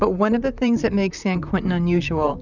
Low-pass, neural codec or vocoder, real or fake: 7.2 kHz; vocoder, 44.1 kHz, 128 mel bands, Pupu-Vocoder; fake